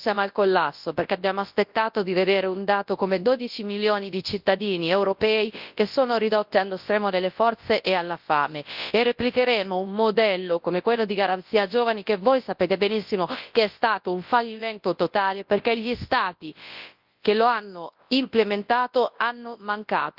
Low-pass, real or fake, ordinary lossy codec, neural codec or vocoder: 5.4 kHz; fake; Opus, 32 kbps; codec, 24 kHz, 0.9 kbps, WavTokenizer, large speech release